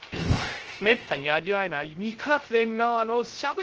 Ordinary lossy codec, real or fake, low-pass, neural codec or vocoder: Opus, 16 kbps; fake; 7.2 kHz; codec, 16 kHz, 0.3 kbps, FocalCodec